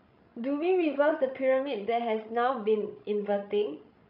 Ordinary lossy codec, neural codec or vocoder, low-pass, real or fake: none; codec, 16 kHz, 16 kbps, FreqCodec, larger model; 5.4 kHz; fake